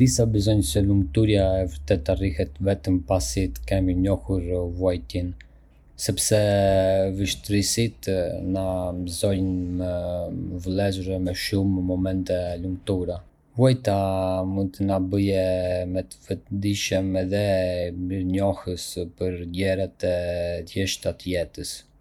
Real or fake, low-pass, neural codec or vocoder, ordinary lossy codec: real; 19.8 kHz; none; none